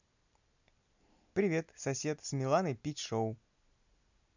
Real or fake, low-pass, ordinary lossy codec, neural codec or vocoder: real; 7.2 kHz; none; none